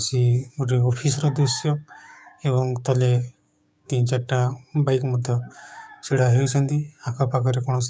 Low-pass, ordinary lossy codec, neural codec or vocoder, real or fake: none; none; codec, 16 kHz, 6 kbps, DAC; fake